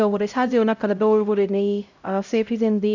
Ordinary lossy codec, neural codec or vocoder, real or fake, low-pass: none; codec, 16 kHz, 0.5 kbps, X-Codec, HuBERT features, trained on LibriSpeech; fake; 7.2 kHz